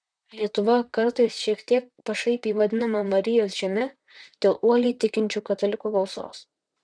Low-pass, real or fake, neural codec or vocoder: 9.9 kHz; fake; vocoder, 44.1 kHz, 128 mel bands, Pupu-Vocoder